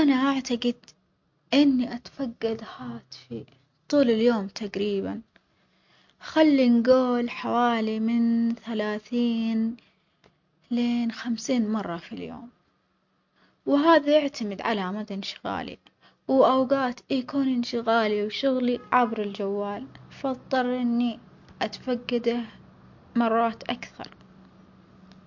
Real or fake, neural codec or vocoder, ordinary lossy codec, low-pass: real; none; MP3, 48 kbps; 7.2 kHz